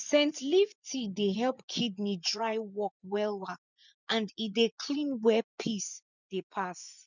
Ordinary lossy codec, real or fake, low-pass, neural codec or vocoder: none; fake; 7.2 kHz; vocoder, 24 kHz, 100 mel bands, Vocos